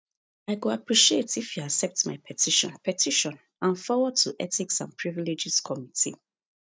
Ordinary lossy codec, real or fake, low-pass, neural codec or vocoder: none; real; none; none